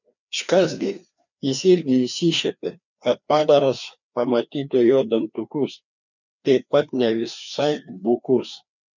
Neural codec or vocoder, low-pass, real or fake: codec, 16 kHz, 2 kbps, FreqCodec, larger model; 7.2 kHz; fake